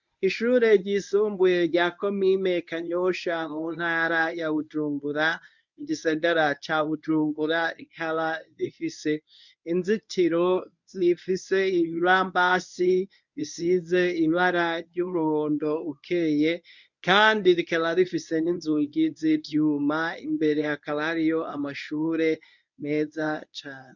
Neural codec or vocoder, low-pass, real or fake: codec, 24 kHz, 0.9 kbps, WavTokenizer, medium speech release version 1; 7.2 kHz; fake